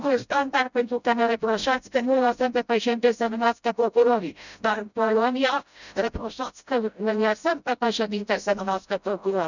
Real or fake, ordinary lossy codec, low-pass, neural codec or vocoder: fake; none; 7.2 kHz; codec, 16 kHz, 0.5 kbps, FreqCodec, smaller model